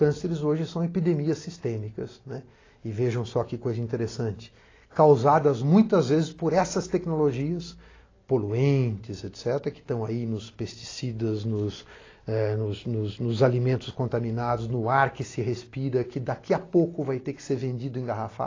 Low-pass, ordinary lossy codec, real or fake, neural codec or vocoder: 7.2 kHz; AAC, 32 kbps; real; none